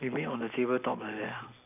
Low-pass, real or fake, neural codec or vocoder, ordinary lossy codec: 3.6 kHz; real; none; none